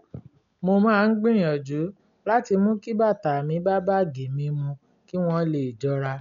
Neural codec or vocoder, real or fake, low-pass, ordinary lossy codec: none; real; 7.2 kHz; none